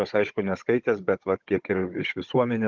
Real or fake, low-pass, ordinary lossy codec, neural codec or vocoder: fake; 7.2 kHz; Opus, 32 kbps; codec, 16 kHz, 16 kbps, FunCodec, trained on Chinese and English, 50 frames a second